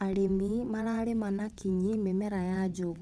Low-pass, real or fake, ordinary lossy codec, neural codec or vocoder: none; fake; none; vocoder, 22.05 kHz, 80 mel bands, WaveNeXt